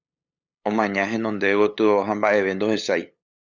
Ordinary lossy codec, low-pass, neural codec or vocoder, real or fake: Opus, 64 kbps; 7.2 kHz; codec, 16 kHz, 8 kbps, FunCodec, trained on LibriTTS, 25 frames a second; fake